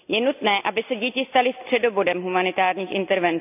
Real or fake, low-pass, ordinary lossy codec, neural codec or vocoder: real; 3.6 kHz; none; none